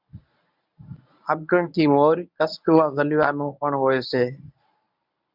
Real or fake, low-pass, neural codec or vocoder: fake; 5.4 kHz; codec, 24 kHz, 0.9 kbps, WavTokenizer, medium speech release version 1